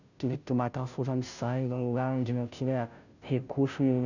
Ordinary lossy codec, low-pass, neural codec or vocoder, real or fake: none; 7.2 kHz; codec, 16 kHz, 0.5 kbps, FunCodec, trained on Chinese and English, 25 frames a second; fake